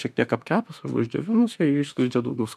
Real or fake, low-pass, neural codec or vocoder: fake; 14.4 kHz; autoencoder, 48 kHz, 32 numbers a frame, DAC-VAE, trained on Japanese speech